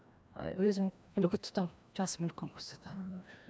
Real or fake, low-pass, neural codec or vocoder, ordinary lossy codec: fake; none; codec, 16 kHz, 1 kbps, FreqCodec, larger model; none